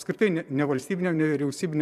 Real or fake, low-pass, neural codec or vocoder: fake; 14.4 kHz; vocoder, 44.1 kHz, 128 mel bands every 512 samples, BigVGAN v2